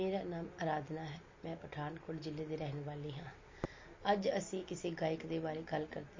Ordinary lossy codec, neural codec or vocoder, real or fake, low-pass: MP3, 32 kbps; none; real; 7.2 kHz